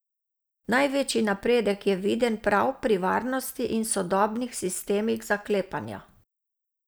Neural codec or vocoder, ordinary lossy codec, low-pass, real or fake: none; none; none; real